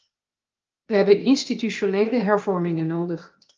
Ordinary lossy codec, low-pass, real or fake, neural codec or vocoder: Opus, 24 kbps; 7.2 kHz; fake; codec, 16 kHz, 0.8 kbps, ZipCodec